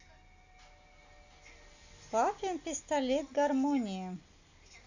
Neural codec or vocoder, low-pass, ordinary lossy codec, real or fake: none; 7.2 kHz; none; real